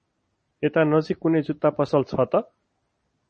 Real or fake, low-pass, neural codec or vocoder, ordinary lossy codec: real; 10.8 kHz; none; MP3, 32 kbps